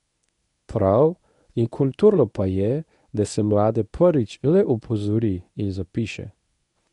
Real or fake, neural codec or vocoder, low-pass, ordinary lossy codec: fake; codec, 24 kHz, 0.9 kbps, WavTokenizer, medium speech release version 1; 10.8 kHz; none